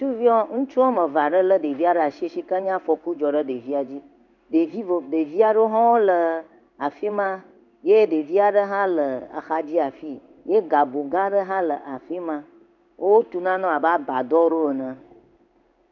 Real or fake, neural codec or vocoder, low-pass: fake; codec, 16 kHz in and 24 kHz out, 1 kbps, XY-Tokenizer; 7.2 kHz